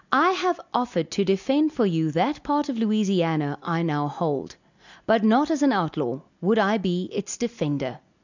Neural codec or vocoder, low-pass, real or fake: none; 7.2 kHz; real